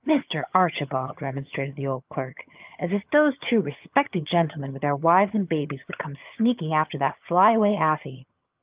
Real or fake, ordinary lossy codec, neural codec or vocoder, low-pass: fake; Opus, 24 kbps; vocoder, 22.05 kHz, 80 mel bands, HiFi-GAN; 3.6 kHz